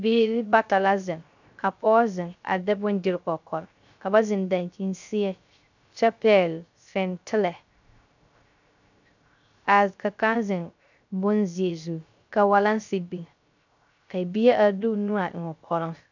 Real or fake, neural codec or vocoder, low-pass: fake; codec, 16 kHz, 0.3 kbps, FocalCodec; 7.2 kHz